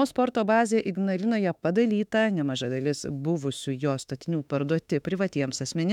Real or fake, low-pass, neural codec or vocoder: fake; 19.8 kHz; autoencoder, 48 kHz, 32 numbers a frame, DAC-VAE, trained on Japanese speech